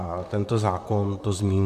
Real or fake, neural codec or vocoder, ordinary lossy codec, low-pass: fake; vocoder, 44.1 kHz, 128 mel bands, Pupu-Vocoder; MP3, 96 kbps; 14.4 kHz